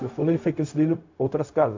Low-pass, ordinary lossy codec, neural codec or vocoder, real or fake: 7.2 kHz; AAC, 48 kbps; codec, 16 kHz, 0.4 kbps, LongCat-Audio-Codec; fake